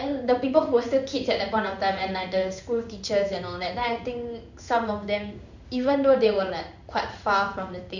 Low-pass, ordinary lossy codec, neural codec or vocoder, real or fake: 7.2 kHz; none; codec, 16 kHz in and 24 kHz out, 1 kbps, XY-Tokenizer; fake